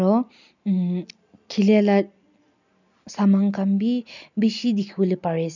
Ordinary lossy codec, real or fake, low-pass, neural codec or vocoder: none; real; 7.2 kHz; none